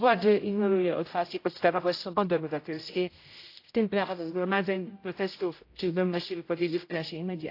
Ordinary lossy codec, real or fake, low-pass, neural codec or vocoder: AAC, 32 kbps; fake; 5.4 kHz; codec, 16 kHz, 0.5 kbps, X-Codec, HuBERT features, trained on general audio